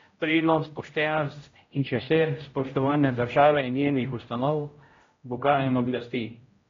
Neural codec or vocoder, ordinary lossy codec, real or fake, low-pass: codec, 16 kHz, 0.5 kbps, X-Codec, HuBERT features, trained on general audio; AAC, 32 kbps; fake; 7.2 kHz